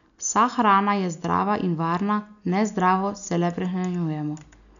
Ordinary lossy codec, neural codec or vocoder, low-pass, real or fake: none; none; 7.2 kHz; real